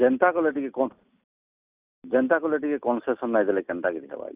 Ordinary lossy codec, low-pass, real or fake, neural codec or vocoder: Opus, 64 kbps; 3.6 kHz; real; none